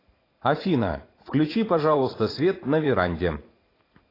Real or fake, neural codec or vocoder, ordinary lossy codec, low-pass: fake; vocoder, 44.1 kHz, 80 mel bands, Vocos; AAC, 24 kbps; 5.4 kHz